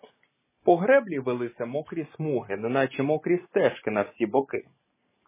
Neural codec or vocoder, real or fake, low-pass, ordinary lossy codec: none; real; 3.6 kHz; MP3, 16 kbps